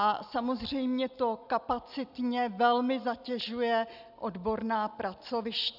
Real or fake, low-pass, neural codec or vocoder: real; 5.4 kHz; none